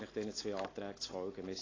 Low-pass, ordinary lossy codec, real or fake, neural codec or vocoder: 7.2 kHz; AAC, 32 kbps; real; none